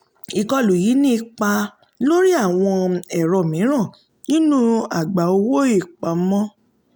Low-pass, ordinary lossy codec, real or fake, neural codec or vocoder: none; none; real; none